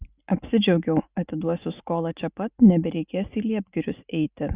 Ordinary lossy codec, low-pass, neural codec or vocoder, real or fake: Opus, 64 kbps; 3.6 kHz; none; real